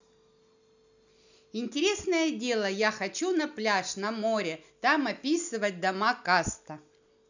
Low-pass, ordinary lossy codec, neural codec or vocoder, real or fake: 7.2 kHz; none; none; real